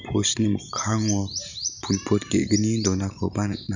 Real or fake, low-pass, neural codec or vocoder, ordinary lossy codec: fake; 7.2 kHz; vocoder, 44.1 kHz, 128 mel bands every 512 samples, BigVGAN v2; none